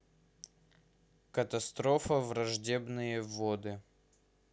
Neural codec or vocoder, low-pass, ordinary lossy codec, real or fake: none; none; none; real